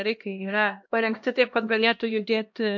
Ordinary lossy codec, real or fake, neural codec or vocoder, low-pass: MP3, 48 kbps; fake; codec, 16 kHz, 1 kbps, X-Codec, HuBERT features, trained on LibriSpeech; 7.2 kHz